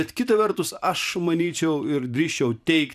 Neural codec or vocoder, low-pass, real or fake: vocoder, 44.1 kHz, 128 mel bands every 512 samples, BigVGAN v2; 14.4 kHz; fake